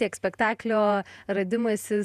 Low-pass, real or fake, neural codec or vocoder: 14.4 kHz; fake; vocoder, 48 kHz, 128 mel bands, Vocos